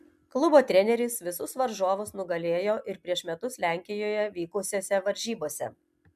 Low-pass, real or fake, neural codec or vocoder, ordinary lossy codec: 14.4 kHz; real; none; MP3, 96 kbps